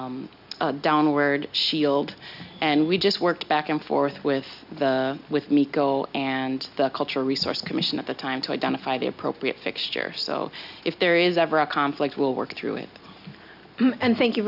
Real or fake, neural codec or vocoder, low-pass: real; none; 5.4 kHz